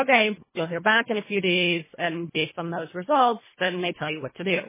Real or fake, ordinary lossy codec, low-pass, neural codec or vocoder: fake; MP3, 16 kbps; 3.6 kHz; codec, 24 kHz, 1.5 kbps, HILCodec